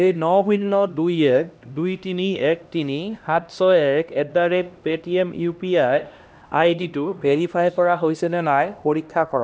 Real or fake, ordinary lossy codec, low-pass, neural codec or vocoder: fake; none; none; codec, 16 kHz, 1 kbps, X-Codec, HuBERT features, trained on LibriSpeech